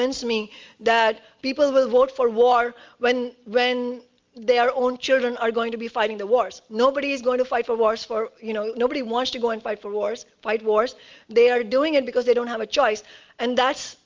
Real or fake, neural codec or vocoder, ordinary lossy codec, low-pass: real; none; Opus, 32 kbps; 7.2 kHz